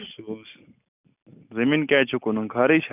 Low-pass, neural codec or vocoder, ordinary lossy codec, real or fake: 3.6 kHz; none; none; real